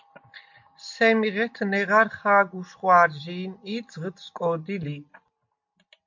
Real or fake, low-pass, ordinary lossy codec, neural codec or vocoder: real; 7.2 kHz; MP3, 48 kbps; none